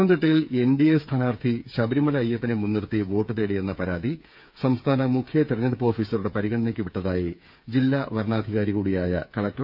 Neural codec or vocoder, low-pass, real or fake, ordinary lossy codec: codec, 16 kHz, 8 kbps, FreqCodec, smaller model; 5.4 kHz; fake; none